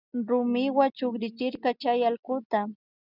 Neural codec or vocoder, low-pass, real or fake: none; 5.4 kHz; real